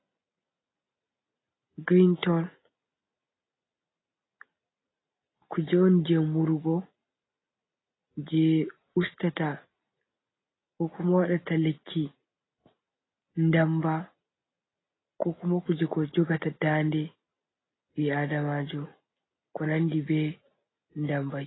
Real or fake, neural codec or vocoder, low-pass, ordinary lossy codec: real; none; 7.2 kHz; AAC, 16 kbps